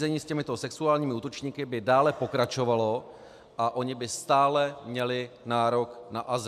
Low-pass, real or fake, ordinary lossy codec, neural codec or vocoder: 14.4 kHz; real; AAC, 96 kbps; none